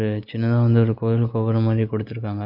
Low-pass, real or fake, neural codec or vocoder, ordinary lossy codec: 5.4 kHz; real; none; none